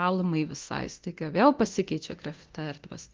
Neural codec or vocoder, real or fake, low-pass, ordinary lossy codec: codec, 24 kHz, 0.9 kbps, DualCodec; fake; 7.2 kHz; Opus, 16 kbps